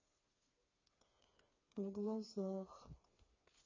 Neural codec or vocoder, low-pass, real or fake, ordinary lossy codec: codec, 16 kHz, 4 kbps, FreqCodec, smaller model; 7.2 kHz; fake; MP3, 32 kbps